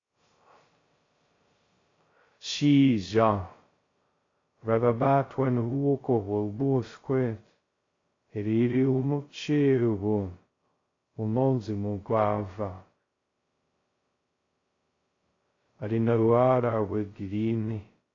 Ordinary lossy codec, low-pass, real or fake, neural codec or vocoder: AAC, 32 kbps; 7.2 kHz; fake; codec, 16 kHz, 0.2 kbps, FocalCodec